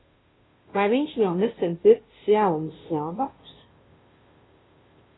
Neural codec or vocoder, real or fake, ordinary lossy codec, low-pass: codec, 16 kHz, 0.5 kbps, FunCodec, trained on Chinese and English, 25 frames a second; fake; AAC, 16 kbps; 7.2 kHz